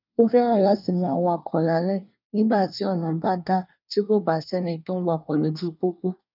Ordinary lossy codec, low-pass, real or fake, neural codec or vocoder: none; 5.4 kHz; fake; codec, 24 kHz, 1 kbps, SNAC